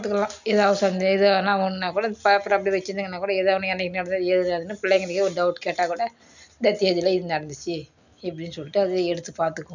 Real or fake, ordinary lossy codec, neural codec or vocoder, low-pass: real; none; none; 7.2 kHz